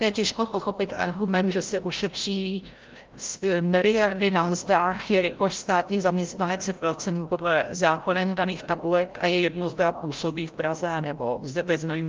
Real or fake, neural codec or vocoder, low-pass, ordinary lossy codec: fake; codec, 16 kHz, 0.5 kbps, FreqCodec, larger model; 7.2 kHz; Opus, 24 kbps